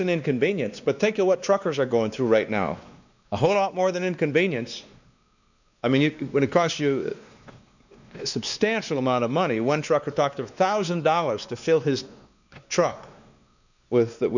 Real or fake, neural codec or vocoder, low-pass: fake; codec, 16 kHz, 2 kbps, X-Codec, WavLM features, trained on Multilingual LibriSpeech; 7.2 kHz